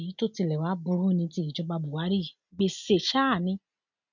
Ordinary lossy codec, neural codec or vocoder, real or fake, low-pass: MP3, 64 kbps; none; real; 7.2 kHz